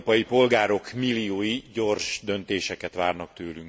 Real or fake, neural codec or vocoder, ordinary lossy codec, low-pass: real; none; none; none